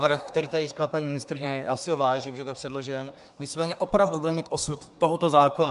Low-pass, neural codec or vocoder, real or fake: 10.8 kHz; codec, 24 kHz, 1 kbps, SNAC; fake